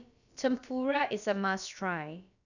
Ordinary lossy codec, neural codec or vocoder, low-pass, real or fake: none; codec, 16 kHz, about 1 kbps, DyCAST, with the encoder's durations; 7.2 kHz; fake